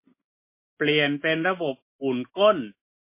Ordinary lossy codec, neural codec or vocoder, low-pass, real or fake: MP3, 24 kbps; none; 3.6 kHz; real